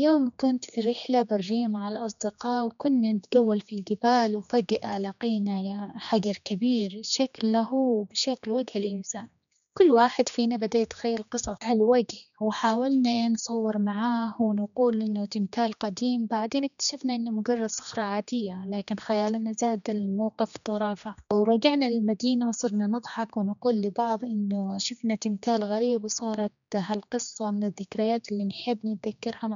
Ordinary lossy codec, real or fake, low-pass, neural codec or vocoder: none; fake; 7.2 kHz; codec, 16 kHz, 2 kbps, X-Codec, HuBERT features, trained on general audio